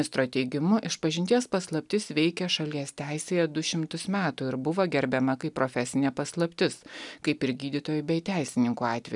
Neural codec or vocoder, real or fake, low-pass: none; real; 10.8 kHz